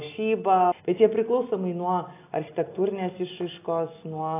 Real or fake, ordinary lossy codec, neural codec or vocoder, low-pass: real; AAC, 24 kbps; none; 3.6 kHz